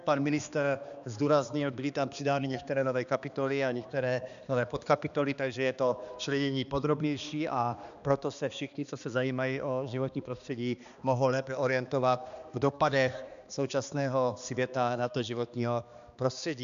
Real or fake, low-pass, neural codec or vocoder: fake; 7.2 kHz; codec, 16 kHz, 2 kbps, X-Codec, HuBERT features, trained on balanced general audio